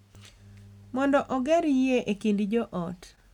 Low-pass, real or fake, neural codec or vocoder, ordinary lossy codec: 19.8 kHz; real; none; none